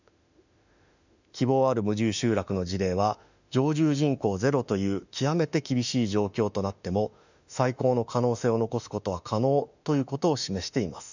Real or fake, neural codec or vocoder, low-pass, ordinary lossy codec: fake; autoencoder, 48 kHz, 32 numbers a frame, DAC-VAE, trained on Japanese speech; 7.2 kHz; none